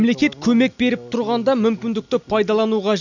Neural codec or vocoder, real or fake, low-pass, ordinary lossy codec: none; real; 7.2 kHz; none